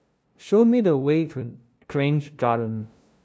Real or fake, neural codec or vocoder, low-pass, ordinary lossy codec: fake; codec, 16 kHz, 0.5 kbps, FunCodec, trained on LibriTTS, 25 frames a second; none; none